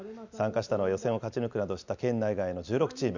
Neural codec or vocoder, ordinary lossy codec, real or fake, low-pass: none; none; real; 7.2 kHz